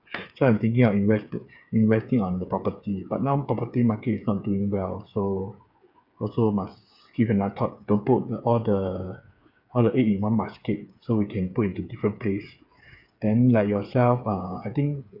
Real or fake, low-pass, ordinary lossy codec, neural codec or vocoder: fake; 5.4 kHz; none; codec, 16 kHz, 8 kbps, FreqCodec, smaller model